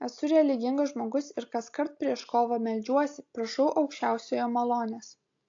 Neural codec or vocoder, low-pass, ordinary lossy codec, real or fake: none; 7.2 kHz; AAC, 48 kbps; real